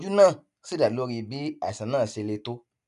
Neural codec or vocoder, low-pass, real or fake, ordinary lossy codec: none; 10.8 kHz; real; none